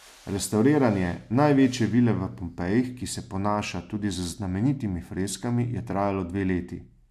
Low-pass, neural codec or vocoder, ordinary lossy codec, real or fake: 14.4 kHz; none; AAC, 96 kbps; real